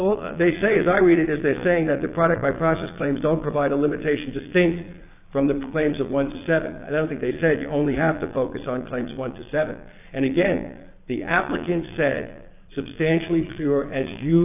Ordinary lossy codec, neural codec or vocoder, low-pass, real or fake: AAC, 32 kbps; vocoder, 22.05 kHz, 80 mel bands, Vocos; 3.6 kHz; fake